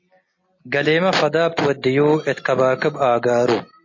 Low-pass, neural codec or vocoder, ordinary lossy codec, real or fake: 7.2 kHz; none; MP3, 32 kbps; real